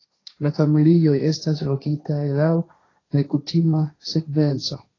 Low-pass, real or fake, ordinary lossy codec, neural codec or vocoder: 7.2 kHz; fake; AAC, 32 kbps; codec, 16 kHz, 1.1 kbps, Voila-Tokenizer